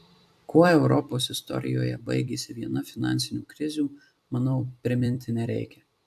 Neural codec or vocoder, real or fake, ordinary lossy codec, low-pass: vocoder, 44.1 kHz, 128 mel bands every 256 samples, BigVGAN v2; fake; AAC, 96 kbps; 14.4 kHz